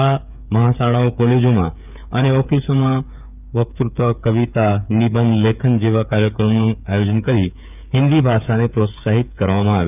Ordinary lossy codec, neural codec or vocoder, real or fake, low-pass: none; codec, 16 kHz, 8 kbps, FreqCodec, smaller model; fake; 3.6 kHz